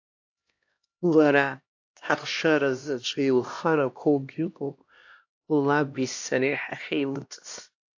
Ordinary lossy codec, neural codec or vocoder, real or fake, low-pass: AAC, 48 kbps; codec, 16 kHz, 1 kbps, X-Codec, HuBERT features, trained on LibriSpeech; fake; 7.2 kHz